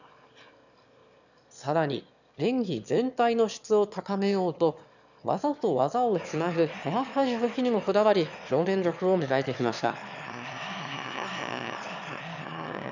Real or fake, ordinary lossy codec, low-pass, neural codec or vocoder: fake; none; 7.2 kHz; autoencoder, 22.05 kHz, a latent of 192 numbers a frame, VITS, trained on one speaker